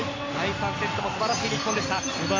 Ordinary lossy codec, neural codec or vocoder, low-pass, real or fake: AAC, 32 kbps; none; 7.2 kHz; real